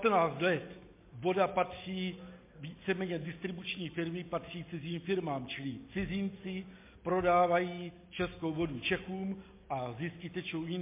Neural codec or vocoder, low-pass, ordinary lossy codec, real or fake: none; 3.6 kHz; MP3, 24 kbps; real